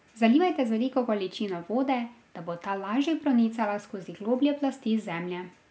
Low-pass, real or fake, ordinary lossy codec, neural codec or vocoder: none; real; none; none